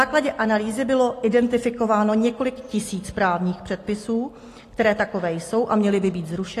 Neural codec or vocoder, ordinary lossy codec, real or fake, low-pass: none; AAC, 48 kbps; real; 14.4 kHz